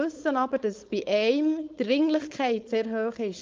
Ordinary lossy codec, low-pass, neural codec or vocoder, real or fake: Opus, 24 kbps; 7.2 kHz; codec, 16 kHz, 4.8 kbps, FACodec; fake